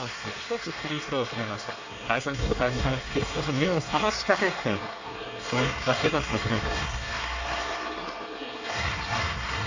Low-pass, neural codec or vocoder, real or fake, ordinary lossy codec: 7.2 kHz; codec, 24 kHz, 1 kbps, SNAC; fake; AAC, 48 kbps